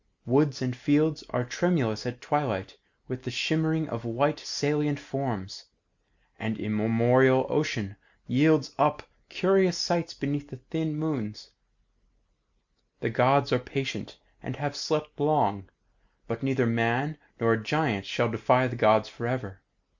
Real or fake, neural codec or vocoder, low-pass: real; none; 7.2 kHz